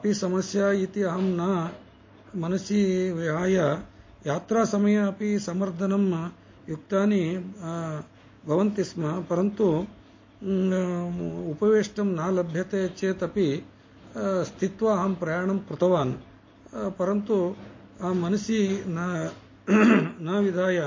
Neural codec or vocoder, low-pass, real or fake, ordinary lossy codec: none; 7.2 kHz; real; MP3, 32 kbps